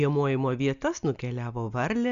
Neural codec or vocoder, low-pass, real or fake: none; 7.2 kHz; real